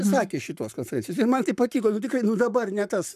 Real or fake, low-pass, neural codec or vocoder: fake; 14.4 kHz; codec, 44.1 kHz, 7.8 kbps, Pupu-Codec